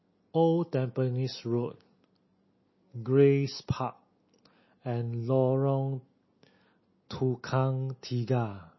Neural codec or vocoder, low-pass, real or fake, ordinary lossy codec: none; 7.2 kHz; real; MP3, 24 kbps